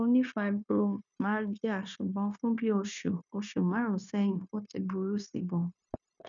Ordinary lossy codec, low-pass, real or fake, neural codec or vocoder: none; 7.2 kHz; fake; codec, 16 kHz, 0.9 kbps, LongCat-Audio-Codec